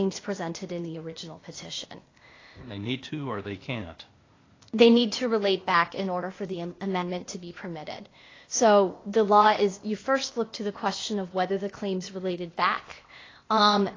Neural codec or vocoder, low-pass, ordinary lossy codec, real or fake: codec, 16 kHz, 0.8 kbps, ZipCodec; 7.2 kHz; AAC, 32 kbps; fake